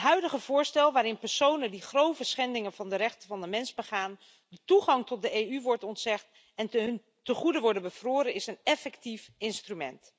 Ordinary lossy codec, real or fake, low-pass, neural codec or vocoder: none; real; none; none